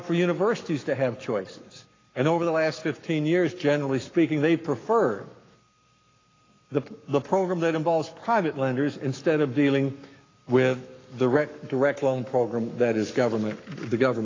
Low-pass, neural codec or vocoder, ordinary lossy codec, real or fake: 7.2 kHz; codec, 44.1 kHz, 7.8 kbps, Pupu-Codec; AAC, 32 kbps; fake